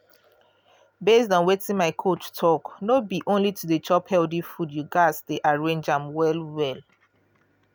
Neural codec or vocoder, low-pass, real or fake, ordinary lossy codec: none; none; real; none